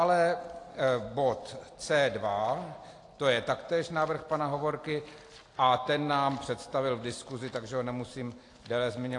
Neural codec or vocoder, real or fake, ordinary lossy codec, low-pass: none; real; AAC, 48 kbps; 10.8 kHz